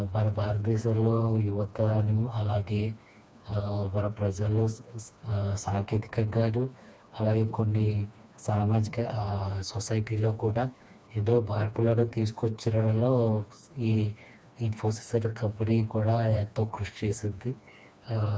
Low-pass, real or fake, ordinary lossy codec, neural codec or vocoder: none; fake; none; codec, 16 kHz, 2 kbps, FreqCodec, smaller model